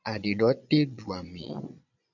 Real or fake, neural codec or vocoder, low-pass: fake; vocoder, 22.05 kHz, 80 mel bands, Vocos; 7.2 kHz